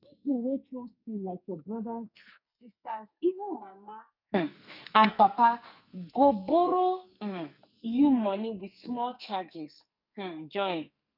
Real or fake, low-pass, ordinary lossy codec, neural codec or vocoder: fake; 5.4 kHz; none; codec, 44.1 kHz, 2.6 kbps, SNAC